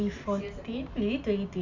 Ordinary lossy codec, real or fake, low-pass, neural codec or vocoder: none; real; 7.2 kHz; none